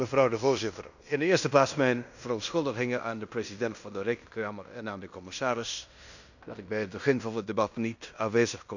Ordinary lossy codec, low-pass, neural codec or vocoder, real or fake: none; 7.2 kHz; codec, 16 kHz in and 24 kHz out, 0.9 kbps, LongCat-Audio-Codec, fine tuned four codebook decoder; fake